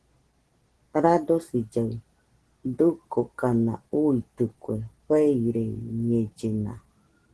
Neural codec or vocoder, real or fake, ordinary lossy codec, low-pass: none; real; Opus, 16 kbps; 10.8 kHz